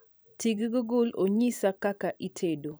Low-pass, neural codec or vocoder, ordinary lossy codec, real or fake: none; vocoder, 44.1 kHz, 128 mel bands every 512 samples, BigVGAN v2; none; fake